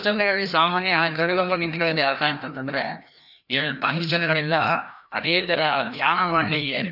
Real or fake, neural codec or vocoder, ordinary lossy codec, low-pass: fake; codec, 16 kHz, 1 kbps, FreqCodec, larger model; none; 5.4 kHz